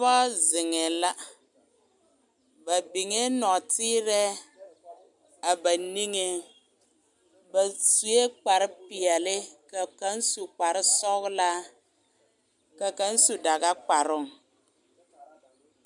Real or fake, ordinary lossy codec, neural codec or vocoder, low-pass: real; MP3, 96 kbps; none; 10.8 kHz